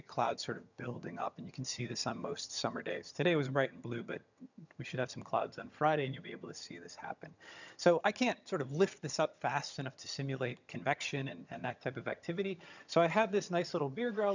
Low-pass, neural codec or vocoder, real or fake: 7.2 kHz; vocoder, 22.05 kHz, 80 mel bands, HiFi-GAN; fake